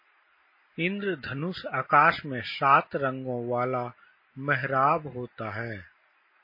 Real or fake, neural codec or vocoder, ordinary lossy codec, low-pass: real; none; MP3, 24 kbps; 5.4 kHz